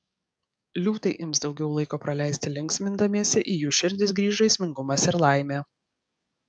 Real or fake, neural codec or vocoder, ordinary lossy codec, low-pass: fake; codec, 44.1 kHz, 7.8 kbps, DAC; MP3, 96 kbps; 9.9 kHz